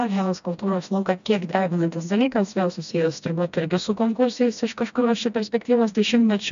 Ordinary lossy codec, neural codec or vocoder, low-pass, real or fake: AAC, 96 kbps; codec, 16 kHz, 1 kbps, FreqCodec, smaller model; 7.2 kHz; fake